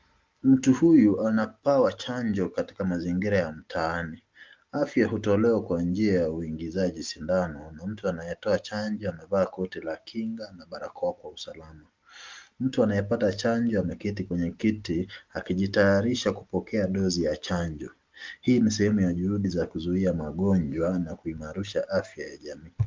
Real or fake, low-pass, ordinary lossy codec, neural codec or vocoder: real; 7.2 kHz; Opus, 32 kbps; none